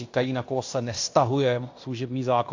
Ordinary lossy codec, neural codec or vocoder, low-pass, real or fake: AAC, 48 kbps; codec, 16 kHz in and 24 kHz out, 0.9 kbps, LongCat-Audio-Codec, fine tuned four codebook decoder; 7.2 kHz; fake